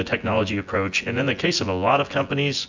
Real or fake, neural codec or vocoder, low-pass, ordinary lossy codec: fake; vocoder, 24 kHz, 100 mel bands, Vocos; 7.2 kHz; MP3, 64 kbps